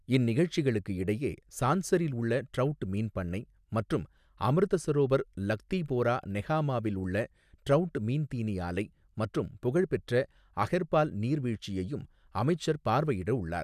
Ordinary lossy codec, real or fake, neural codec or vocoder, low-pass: none; real; none; 14.4 kHz